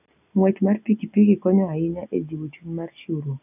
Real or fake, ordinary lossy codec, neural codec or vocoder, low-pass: real; none; none; 3.6 kHz